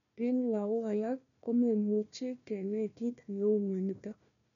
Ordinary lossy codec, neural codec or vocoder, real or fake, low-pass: none; codec, 16 kHz, 1 kbps, FunCodec, trained on Chinese and English, 50 frames a second; fake; 7.2 kHz